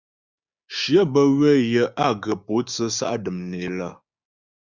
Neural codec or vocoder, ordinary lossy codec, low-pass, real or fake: codec, 16 kHz, 6 kbps, DAC; Opus, 64 kbps; 7.2 kHz; fake